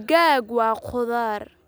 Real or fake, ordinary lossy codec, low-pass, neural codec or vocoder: real; none; none; none